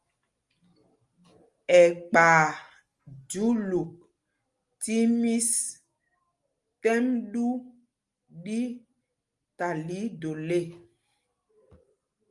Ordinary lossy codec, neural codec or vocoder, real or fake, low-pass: Opus, 32 kbps; none; real; 10.8 kHz